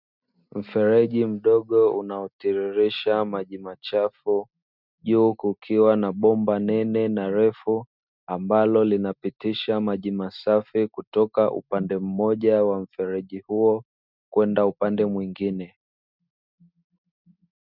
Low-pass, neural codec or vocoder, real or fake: 5.4 kHz; none; real